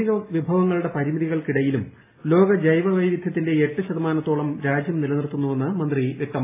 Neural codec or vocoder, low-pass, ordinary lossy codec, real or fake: none; 3.6 kHz; AAC, 24 kbps; real